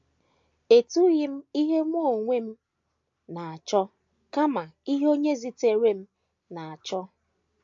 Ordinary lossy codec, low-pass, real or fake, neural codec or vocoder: AAC, 64 kbps; 7.2 kHz; real; none